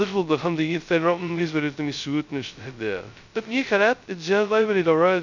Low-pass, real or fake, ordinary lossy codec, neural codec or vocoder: 7.2 kHz; fake; none; codec, 16 kHz, 0.2 kbps, FocalCodec